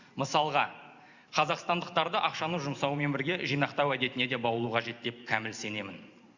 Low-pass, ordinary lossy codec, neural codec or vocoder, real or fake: 7.2 kHz; Opus, 64 kbps; none; real